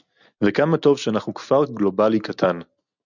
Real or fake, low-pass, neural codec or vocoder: real; 7.2 kHz; none